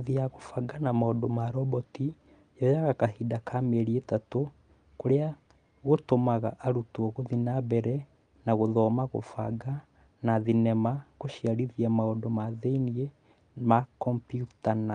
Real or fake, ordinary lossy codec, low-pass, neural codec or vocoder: real; Opus, 32 kbps; 9.9 kHz; none